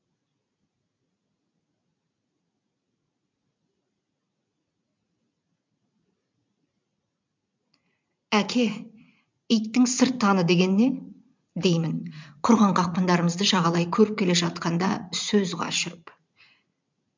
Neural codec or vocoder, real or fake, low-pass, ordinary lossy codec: vocoder, 44.1 kHz, 80 mel bands, Vocos; fake; 7.2 kHz; MP3, 64 kbps